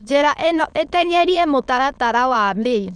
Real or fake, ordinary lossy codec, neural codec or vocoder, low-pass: fake; none; autoencoder, 22.05 kHz, a latent of 192 numbers a frame, VITS, trained on many speakers; 9.9 kHz